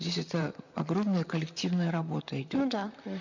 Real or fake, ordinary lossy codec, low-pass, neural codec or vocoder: fake; none; 7.2 kHz; vocoder, 44.1 kHz, 128 mel bands, Pupu-Vocoder